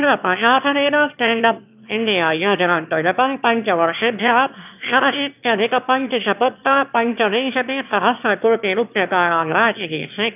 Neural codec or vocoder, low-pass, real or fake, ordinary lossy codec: autoencoder, 22.05 kHz, a latent of 192 numbers a frame, VITS, trained on one speaker; 3.6 kHz; fake; none